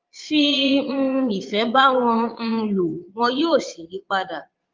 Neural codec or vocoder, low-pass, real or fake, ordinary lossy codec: vocoder, 22.05 kHz, 80 mel bands, Vocos; 7.2 kHz; fake; Opus, 32 kbps